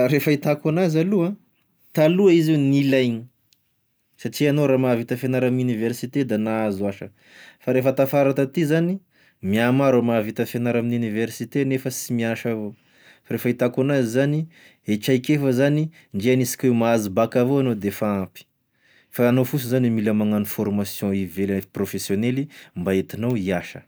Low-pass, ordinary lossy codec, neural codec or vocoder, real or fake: none; none; none; real